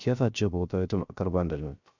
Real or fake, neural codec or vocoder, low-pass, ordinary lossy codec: fake; codec, 16 kHz, 0.3 kbps, FocalCodec; 7.2 kHz; none